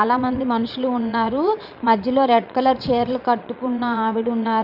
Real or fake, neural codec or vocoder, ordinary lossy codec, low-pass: fake; vocoder, 22.05 kHz, 80 mel bands, Vocos; none; 5.4 kHz